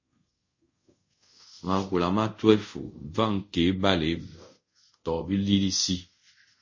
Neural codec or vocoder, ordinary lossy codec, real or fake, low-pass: codec, 24 kHz, 0.5 kbps, DualCodec; MP3, 32 kbps; fake; 7.2 kHz